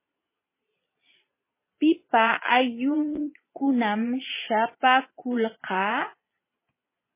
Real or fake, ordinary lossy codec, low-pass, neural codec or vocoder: fake; MP3, 16 kbps; 3.6 kHz; vocoder, 22.05 kHz, 80 mel bands, Vocos